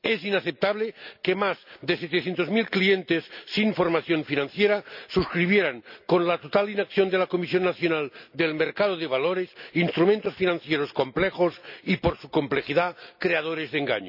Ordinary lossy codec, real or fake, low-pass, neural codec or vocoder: none; real; 5.4 kHz; none